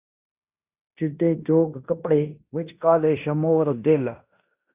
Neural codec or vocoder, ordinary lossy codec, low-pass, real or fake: codec, 16 kHz in and 24 kHz out, 0.9 kbps, LongCat-Audio-Codec, fine tuned four codebook decoder; Opus, 64 kbps; 3.6 kHz; fake